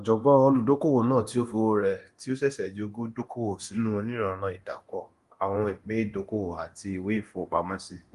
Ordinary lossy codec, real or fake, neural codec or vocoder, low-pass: Opus, 24 kbps; fake; codec, 24 kHz, 0.9 kbps, DualCodec; 10.8 kHz